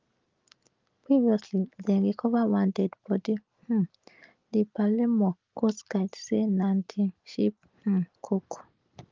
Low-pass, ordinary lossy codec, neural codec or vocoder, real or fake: 7.2 kHz; Opus, 32 kbps; none; real